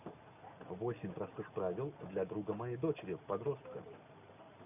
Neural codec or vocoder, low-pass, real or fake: none; 3.6 kHz; real